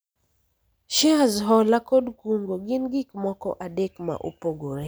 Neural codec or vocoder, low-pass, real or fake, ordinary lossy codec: none; none; real; none